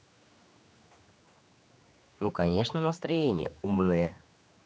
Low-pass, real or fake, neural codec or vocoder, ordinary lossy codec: none; fake; codec, 16 kHz, 2 kbps, X-Codec, HuBERT features, trained on general audio; none